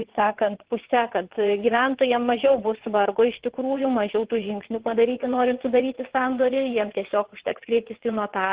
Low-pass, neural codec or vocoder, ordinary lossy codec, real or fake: 3.6 kHz; vocoder, 22.05 kHz, 80 mel bands, WaveNeXt; Opus, 16 kbps; fake